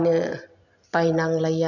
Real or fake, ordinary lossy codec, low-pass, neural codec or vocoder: real; none; 7.2 kHz; none